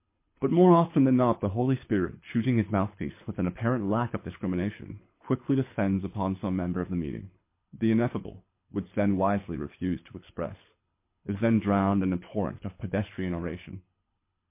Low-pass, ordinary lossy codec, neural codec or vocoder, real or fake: 3.6 kHz; MP3, 24 kbps; codec, 24 kHz, 6 kbps, HILCodec; fake